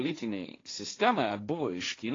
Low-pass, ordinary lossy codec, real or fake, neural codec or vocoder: 7.2 kHz; AAC, 32 kbps; fake; codec, 16 kHz, 1.1 kbps, Voila-Tokenizer